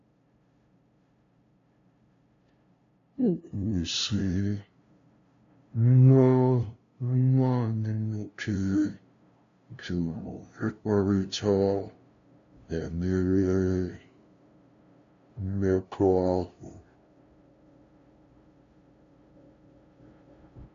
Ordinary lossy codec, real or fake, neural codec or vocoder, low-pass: AAC, 48 kbps; fake; codec, 16 kHz, 0.5 kbps, FunCodec, trained on LibriTTS, 25 frames a second; 7.2 kHz